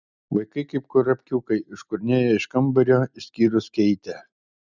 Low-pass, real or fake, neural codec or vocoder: 7.2 kHz; real; none